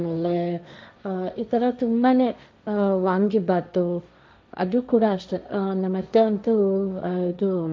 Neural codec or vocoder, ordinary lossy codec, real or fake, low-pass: codec, 16 kHz, 1.1 kbps, Voila-Tokenizer; none; fake; none